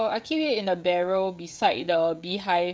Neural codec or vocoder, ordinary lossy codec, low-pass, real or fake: codec, 16 kHz, 16 kbps, FreqCodec, smaller model; none; none; fake